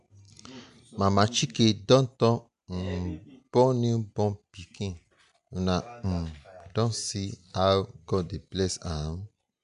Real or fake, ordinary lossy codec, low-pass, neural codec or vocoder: real; none; 10.8 kHz; none